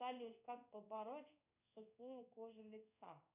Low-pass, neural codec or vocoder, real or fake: 3.6 kHz; codec, 16 kHz in and 24 kHz out, 1 kbps, XY-Tokenizer; fake